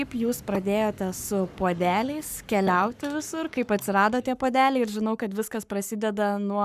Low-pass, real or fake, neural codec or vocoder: 14.4 kHz; fake; codec, 44.1 kHz, 7.8 kbps, Pupu-Codec